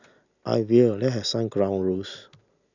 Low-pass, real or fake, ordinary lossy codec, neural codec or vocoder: 7.2 kHz; real; none; none